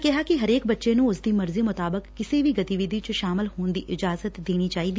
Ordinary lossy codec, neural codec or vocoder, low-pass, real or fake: none; none; none; real